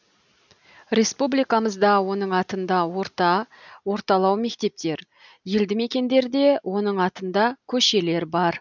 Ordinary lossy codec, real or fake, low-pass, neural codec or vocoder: none; real; 7.2 kHz; none